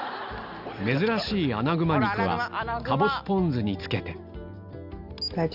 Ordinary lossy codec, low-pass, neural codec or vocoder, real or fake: none; 5.4 kHz; none; real